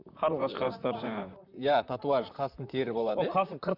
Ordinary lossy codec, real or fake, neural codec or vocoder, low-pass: none; fake; vocoder, 44.1 kHz, 128 mel bands, Pupu-Vocoder; 5.4 kHz